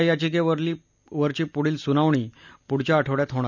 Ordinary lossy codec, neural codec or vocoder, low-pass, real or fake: none; none; 7.2 kHz; real